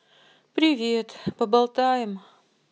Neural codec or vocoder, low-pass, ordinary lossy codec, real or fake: none; none; none; real